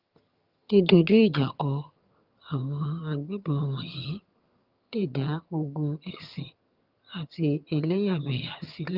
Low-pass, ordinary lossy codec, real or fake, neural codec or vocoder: 5.4 kHz; Opus, 32 kbps; fake; vocoder, 22.05 kHz, 80 mel bands, HiFi-GAN